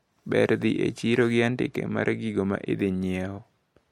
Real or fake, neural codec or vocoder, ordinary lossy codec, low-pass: real; none; MP3, 64 kbps; 19.8 kHz